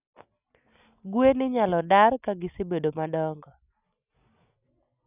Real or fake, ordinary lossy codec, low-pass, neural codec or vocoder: real; none; 3.6 kHz; none